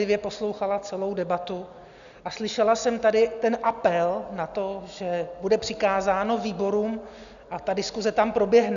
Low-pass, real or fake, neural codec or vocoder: 7.2 kHz; real; none